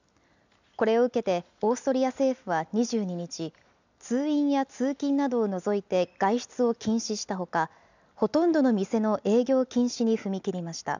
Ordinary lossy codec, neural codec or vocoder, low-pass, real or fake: none; none; 7.2 kHz; real